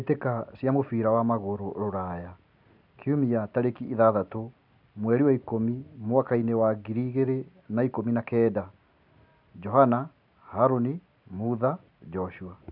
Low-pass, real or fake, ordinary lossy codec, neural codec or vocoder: 5.4 kHz; real; none; none